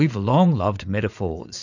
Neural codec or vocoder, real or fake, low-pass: vocoder, 44.1 kHz, 80 mel bands, Vocos; fake; 7.2 kHz